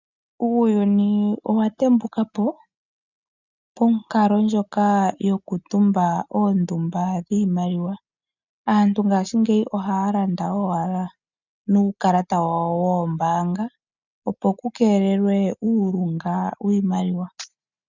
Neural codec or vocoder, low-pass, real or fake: none; 7.2 kHz; real